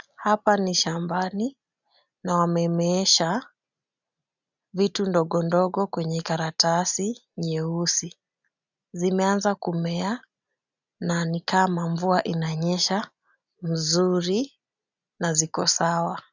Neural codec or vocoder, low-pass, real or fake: none; 7.2 kHz; real